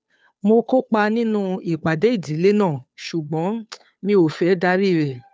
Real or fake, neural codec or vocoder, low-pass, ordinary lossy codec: fake; codec, 16 kHz, 2 kbps, FunCodec, trained on Chinese and English, 25 frames a second; none; none